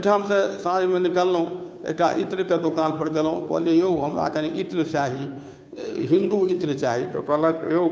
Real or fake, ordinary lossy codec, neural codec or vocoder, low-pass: fake; none; codec, 16 kHz, 2 kbps, FunCodec, trained on Chinese and English, 25 frames a second; none